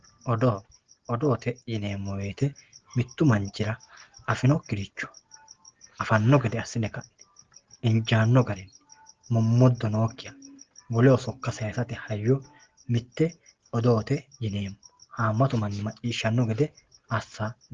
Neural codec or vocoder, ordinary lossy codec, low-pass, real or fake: none; Opus, 16 kbps; 7.2 kHz; real